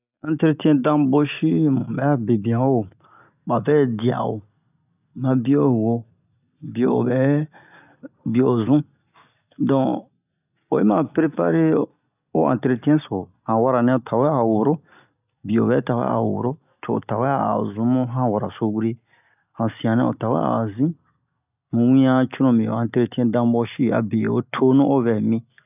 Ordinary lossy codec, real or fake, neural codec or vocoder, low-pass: none; real; none; 3.6 kHz